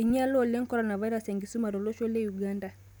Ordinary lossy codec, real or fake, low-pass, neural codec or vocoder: none; real; none; none